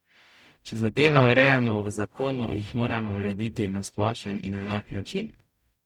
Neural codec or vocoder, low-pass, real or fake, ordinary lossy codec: codec, 44.1 kHz, 0.9 kbps, DAC; 19.8 kHz; fake; none